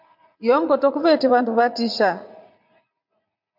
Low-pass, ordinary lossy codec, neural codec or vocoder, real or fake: 5.4 kHz; MP3, 48 kbps; vocoder, 44.1 kHz, 80 mel bands, Vocos; fake